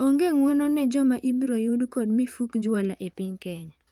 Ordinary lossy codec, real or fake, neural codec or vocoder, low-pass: Opus, 32 kbps; fake; vocoder, 44.1 kHz, 128 mel bands, Pupu-Vocoder; 19.8 kHz